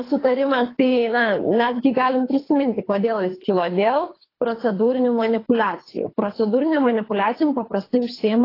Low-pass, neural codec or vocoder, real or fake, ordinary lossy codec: 5.4 kHz; codec, 24 kHz, 3 kbps, HILCodec; fake; AAC, 24 kbps